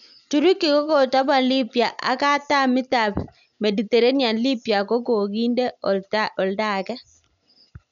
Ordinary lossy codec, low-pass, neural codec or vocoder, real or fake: none; 7.2 kHz; none; real